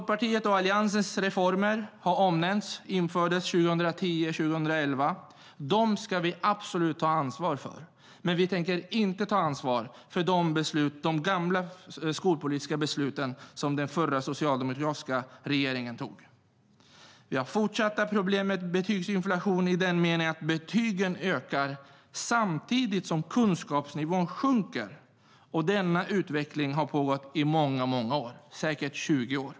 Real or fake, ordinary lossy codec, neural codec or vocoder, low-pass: real; none; none; none